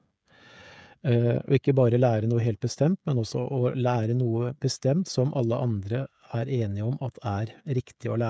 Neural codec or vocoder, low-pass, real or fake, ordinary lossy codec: codec, 16 kHz, 16 kbps, FreqCodec, smaller model; none; fake; none